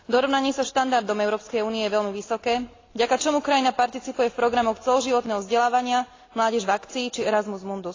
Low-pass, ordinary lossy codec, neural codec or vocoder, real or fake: 7.2 kHz; AAC, 32 kbps; none; real